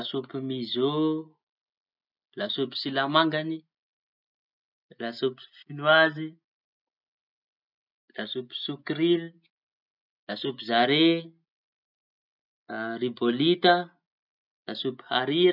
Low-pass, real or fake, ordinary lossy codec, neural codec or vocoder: 5.4 kHz; real; none; none